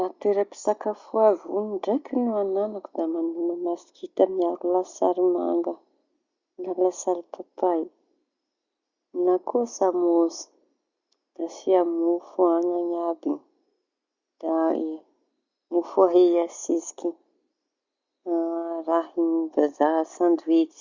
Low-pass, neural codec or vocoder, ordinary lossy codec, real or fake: 7.2 kHz; none; Opus, 64 kbps; real